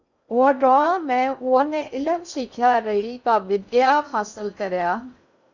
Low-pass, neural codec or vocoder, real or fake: 7.2 kHz; codec, 16 kHz in and 24 kHz out, 0.6 kbps, FocalCodec, streaming, 2048 codes; fake